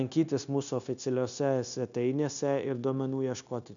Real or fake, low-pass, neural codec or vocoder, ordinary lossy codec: fake; 7.2 kHz; codec, 16 kHz, 0.9 kbps, LongCat-Audio-Codec; MP3, 96 kbps